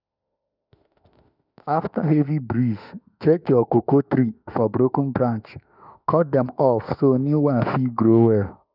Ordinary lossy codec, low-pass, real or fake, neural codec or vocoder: none; 5.4 kHz; fake; autoencoder, 48 kHz, 32 numbers a frame, DAC-VAE, trained on Japanese speech